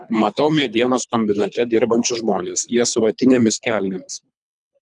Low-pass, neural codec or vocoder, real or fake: 10.8 kHz; codec, 24 kHz, 3 kbps, HILCodec; fake